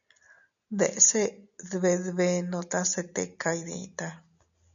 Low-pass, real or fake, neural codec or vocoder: 7.2 kHz; real; none